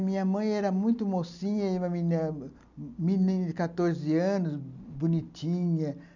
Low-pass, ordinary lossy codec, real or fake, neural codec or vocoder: 7.2 kHz; none; real; none